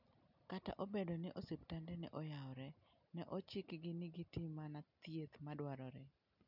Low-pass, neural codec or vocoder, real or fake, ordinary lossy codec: 5.4 kHz; none; real; none